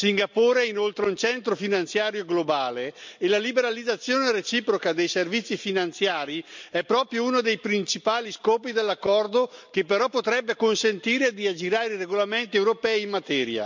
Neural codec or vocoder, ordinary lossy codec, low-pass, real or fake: none; MP3, 64 kbps; 7.2 kHz; real